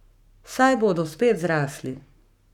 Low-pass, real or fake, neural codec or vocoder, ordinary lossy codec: 19.8 kHz; fake; codec, 44.1 kHz, 7.8 kbps, Pupu-Codec; none